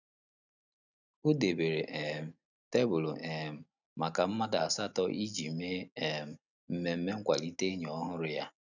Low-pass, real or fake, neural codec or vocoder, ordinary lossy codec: 7.2 kHz; real; none; none